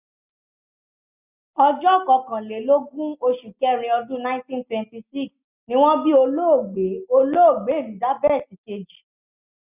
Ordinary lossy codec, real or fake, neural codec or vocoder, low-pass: none; real; none; 3.6 kHz